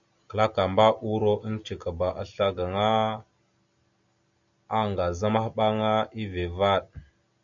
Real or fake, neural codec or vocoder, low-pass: real; none; 7.2 kHz